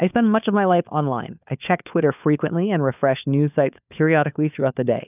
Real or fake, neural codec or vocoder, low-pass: fake; codec, 16 kHz, 4 kbps, FunCodec, trained on LibriTTS, 50 frames a second; 3.6 kHz